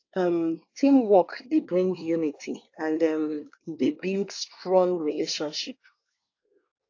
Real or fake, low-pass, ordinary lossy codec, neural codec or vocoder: fake; 7.2 kHz; AAC, 48 kbps; codec, 24 kHz, 1 kbps, SNAC